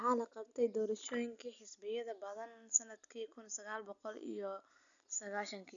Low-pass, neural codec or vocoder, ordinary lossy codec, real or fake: 7.2 kHz; none; none; real